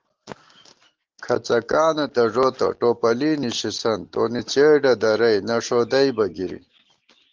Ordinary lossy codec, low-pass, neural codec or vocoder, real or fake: Opus, 16 kbps; 7.2 kHz; none; real